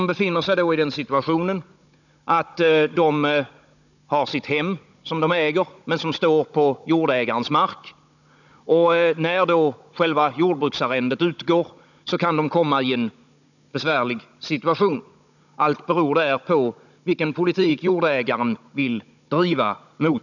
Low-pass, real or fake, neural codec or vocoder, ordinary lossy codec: 7.2 kHz; fake; codec, 16 kHz, 16 kbps, FunCodec, trained on Chinese and English, 50 frames a second; none